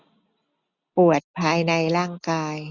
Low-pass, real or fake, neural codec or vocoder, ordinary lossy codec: 7.2 kHz; real; none; none